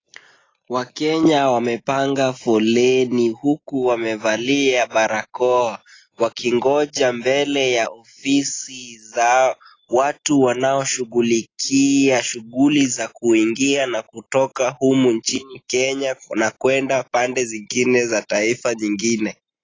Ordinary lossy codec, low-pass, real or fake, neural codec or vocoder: AAC, 32 kbps; 7.2 kHz; real; none